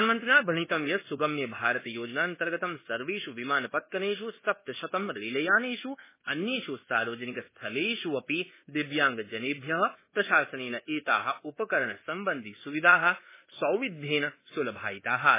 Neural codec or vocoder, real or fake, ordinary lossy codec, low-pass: codec, 24 kHz, 1.2 kbps, DualCodec; fake; MP3, 16 kbps; 3.6 kHz